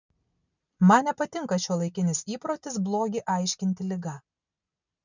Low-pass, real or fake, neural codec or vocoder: 7.2 kHz; real; none